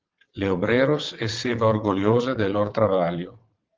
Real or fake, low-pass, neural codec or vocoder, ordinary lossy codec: fake; 7.2 kHz; vocoder, 22.05 kHz, 80 mel bands, WaveNeXt; Opus, 32 kbps